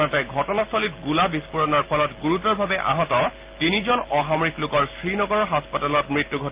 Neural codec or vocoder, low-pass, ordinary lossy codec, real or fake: none; 3.6 kHz; Opus, 32 kbps; real